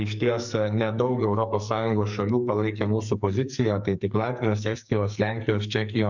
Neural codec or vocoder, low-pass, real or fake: codec, 44.1 kHz, 2.6 kbps, SNAC; 7.2 kHz; fake